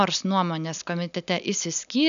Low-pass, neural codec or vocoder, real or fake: 7.2 kHz; none; real